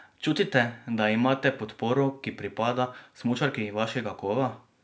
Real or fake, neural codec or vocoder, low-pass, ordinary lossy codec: real; none; none; none